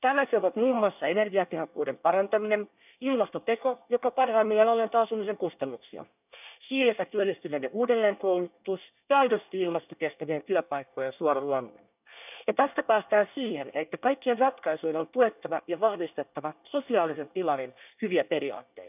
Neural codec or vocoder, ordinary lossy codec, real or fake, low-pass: codec, 24 kHz, 1 kbps, SNAC; none; fake; 3.6 kHz